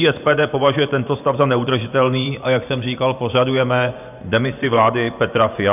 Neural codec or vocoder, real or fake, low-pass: vocoder, 24 kHz, 100 mel bands, Vocos; fake; 3.6 kHz